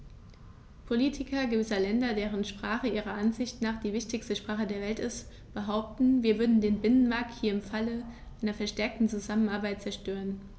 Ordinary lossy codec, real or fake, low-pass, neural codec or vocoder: none; real; none; none